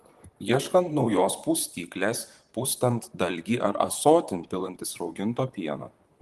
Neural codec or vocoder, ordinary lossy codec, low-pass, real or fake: vocoder, 44.1 kHz, 128 mel bands, Pupu-Vocoder; Opus, 24 kbps; 14.4 kHz; fake